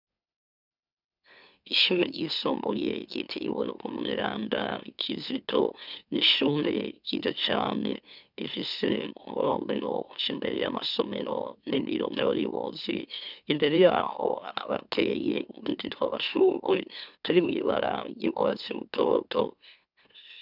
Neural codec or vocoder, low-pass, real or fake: autoencoder, 44.1 kHz, a latent of 192 numbers a frame, MeloTTS; 5.4 kHz; fake